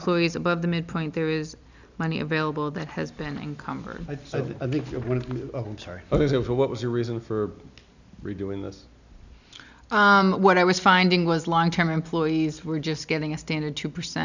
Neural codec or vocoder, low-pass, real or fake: none; 7.2 kHz; real